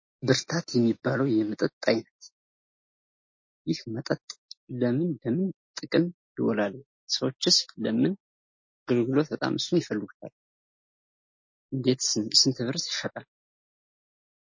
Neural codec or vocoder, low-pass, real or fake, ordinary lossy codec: none; 7.2 kHz; real; MP3, 32 kbps